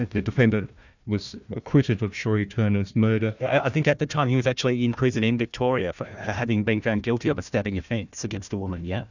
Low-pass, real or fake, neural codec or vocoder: 7.2 kHz; fake; codec, 16 kHz, 1 kbps, FunCodec, trained on Chinese and English, 50 frames a second